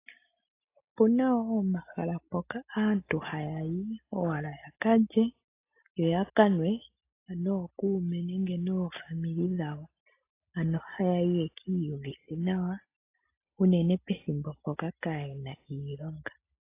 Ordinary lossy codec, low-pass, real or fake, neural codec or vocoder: AAC, 24 kbps; 3.6 kHz; real; none